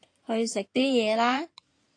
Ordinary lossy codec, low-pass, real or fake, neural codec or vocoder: AAC, 48 kbps; 9.9 kHz; fake; codec, 16 kHz in and 24 kHz out, 2.2 kbps, FireRedTTS-2 codec